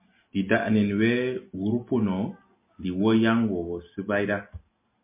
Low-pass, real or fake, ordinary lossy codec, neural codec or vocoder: 3.6 kHz; real; MP3, 24 kbps; none